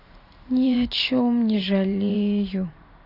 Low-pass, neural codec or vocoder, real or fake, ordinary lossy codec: 5.4 kHz; vocoder, 44.1 kHz, 128 mel bands every 512 samples, BigVGAN v2; fake; AAC, 32 kbps